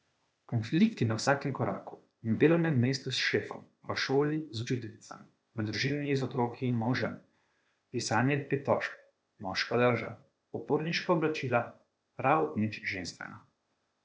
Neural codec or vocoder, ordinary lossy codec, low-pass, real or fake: codec, 16 kHz, 0.8 kbps, ZipCodec; none; none; fake